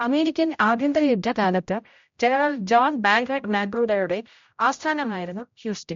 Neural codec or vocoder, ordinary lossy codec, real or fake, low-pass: codec, 16 kHz, 0.5 kbps, X-Codec, HuBERT features, trained on general audio; MP3, 48 kbps; fake; 7.2 kHz